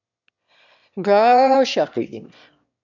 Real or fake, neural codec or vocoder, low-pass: fake; autoencoder, 22.05 kHz, a latent of 192 numbers a frame, VITS, trained on one speaker; 7.2 kHz